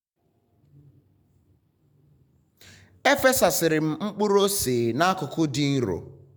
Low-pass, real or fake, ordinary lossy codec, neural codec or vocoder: none; real; none; none